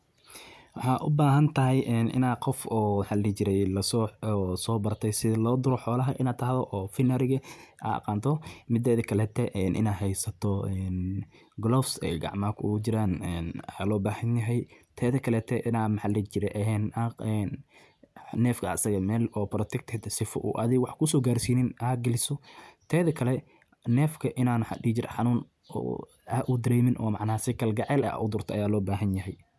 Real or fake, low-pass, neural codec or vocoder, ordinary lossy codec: real; none; none; none